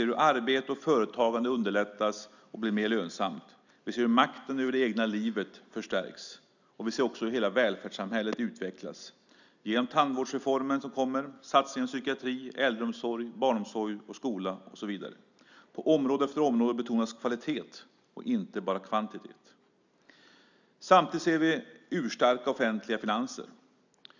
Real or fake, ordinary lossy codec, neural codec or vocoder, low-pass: real; none; none; 7.2 kHz